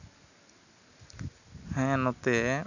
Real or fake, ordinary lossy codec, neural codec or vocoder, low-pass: real; none; none; 7.2 kHz